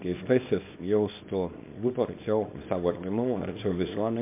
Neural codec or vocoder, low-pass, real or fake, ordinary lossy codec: codec, 24 kHz, 0.9 kbps, WavTokenizer, small release; 3.6 kHz; fake; MP3, 32 kbps